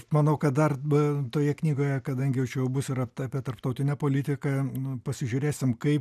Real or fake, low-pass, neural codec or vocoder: real; 14.4 kHz; none